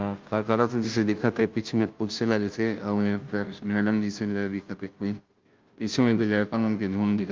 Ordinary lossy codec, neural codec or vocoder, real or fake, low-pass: Opus, 24 kbps; codec, 16 kHz, 0.5 kbps, FunCodec, trained on Chinese and English, 25 frames a second; fake; 7.2 kHz